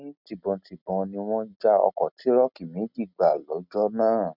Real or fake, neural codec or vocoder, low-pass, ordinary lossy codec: real; none; 5.4 kHz; none